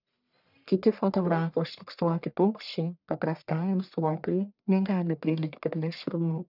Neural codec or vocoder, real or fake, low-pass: codec, 44.1 kHz, 1.7 kbps, Pupu-Codec; fake; 5.4 kHz